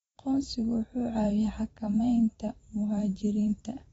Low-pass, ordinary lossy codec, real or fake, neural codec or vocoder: 19.8 kHz; AAC, 24 kbps; fake; vocoder, 44.1 kHz, 128 mel bands every 512 samples, BigVGAN v2